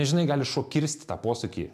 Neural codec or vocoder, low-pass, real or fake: none; 14.4 kHz; real